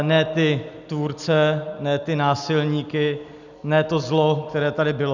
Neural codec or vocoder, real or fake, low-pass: none; real; 7.2 kHz